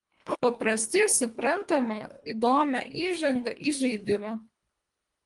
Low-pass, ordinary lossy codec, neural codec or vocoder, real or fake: 10.8 kHz; Opus, 32 kbps; codec, 24 kHz, 1.5 kbps, HILCodec; fake